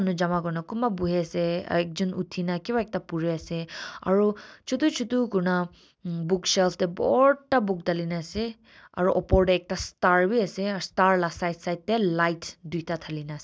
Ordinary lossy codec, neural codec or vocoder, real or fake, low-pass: none; none; real; none